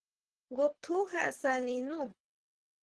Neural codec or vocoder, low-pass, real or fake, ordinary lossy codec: codec, 24 kHz, 3 kbps, HILCodec; 10.8 kHz; fake; Opus, 16 kbps